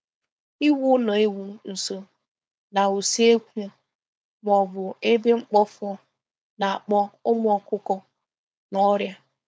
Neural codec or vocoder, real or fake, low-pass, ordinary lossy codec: codec, 16 kHz, 4.8 kbps, FACodec; fake; none; none